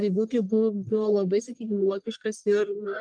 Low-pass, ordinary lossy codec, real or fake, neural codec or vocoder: 9.9 kHz; Opus, 32 kbps; fake; codec, 44.1 kHz, 1.7 kbps, Pupu-Codec